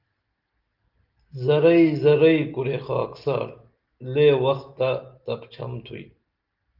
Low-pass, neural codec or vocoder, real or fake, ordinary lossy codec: 5.4 kHz; none; real; Opus, 32 kbps